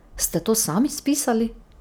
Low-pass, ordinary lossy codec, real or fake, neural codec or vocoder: none; none; real; none